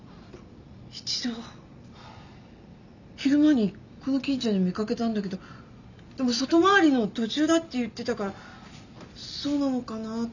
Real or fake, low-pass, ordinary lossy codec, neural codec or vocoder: real; 7.2 kHz; none; none